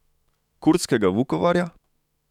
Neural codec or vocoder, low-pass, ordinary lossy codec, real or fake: autoencoder, 48 kHz, 128 numbers a frame, DAC-VAE, trained on Japanese speech; 19.8 kHz; none; fake